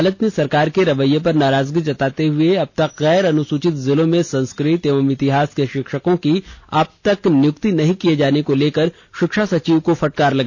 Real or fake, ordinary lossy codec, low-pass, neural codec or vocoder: real; none; none; none